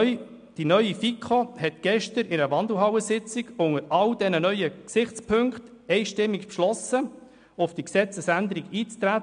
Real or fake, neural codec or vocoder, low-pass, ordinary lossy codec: real; none; 9.9 kHz; MP3, 96 kbps